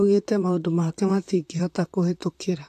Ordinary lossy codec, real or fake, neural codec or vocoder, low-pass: AAC, 64 kbps; fake; vocoder, 44.1 kHz, 128 mel bands, Pupu-Vocoder; 14.4 kHz